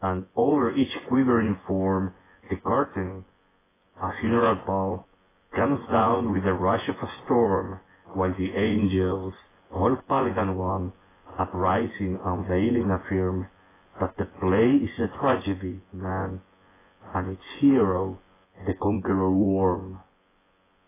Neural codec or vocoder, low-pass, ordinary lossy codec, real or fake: vocoder, 24 kHz, 100 mel bands, Vocos; 3.6 kHz; AAC, 16 kbps; fake